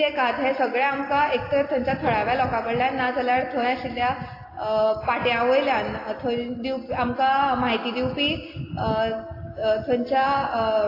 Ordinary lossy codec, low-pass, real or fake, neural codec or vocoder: AAC, 24 kbps; 5.4 kHz; real; none